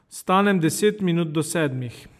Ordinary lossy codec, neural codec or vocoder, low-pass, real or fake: MP3, 96 kbps; none; 14.4 kHz; real